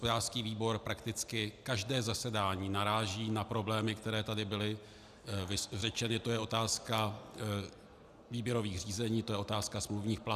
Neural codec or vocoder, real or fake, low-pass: none; real; 14.4 kHz